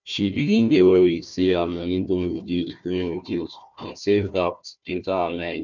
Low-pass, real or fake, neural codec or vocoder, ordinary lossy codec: 7.2 kHz; fake; codec, 16 kHz, 1 kbps, FunCodec, trained on Chinese and English, 50 frames a second; none